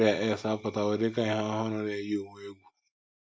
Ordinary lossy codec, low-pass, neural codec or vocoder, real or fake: none; none; none; real